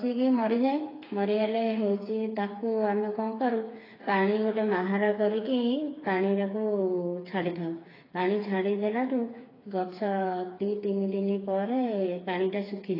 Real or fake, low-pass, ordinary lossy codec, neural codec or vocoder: fake; 5.4 kHz; AAC, 24 kbps; codec, 16 kHz, 4 kbps, FreqCodec, smaller model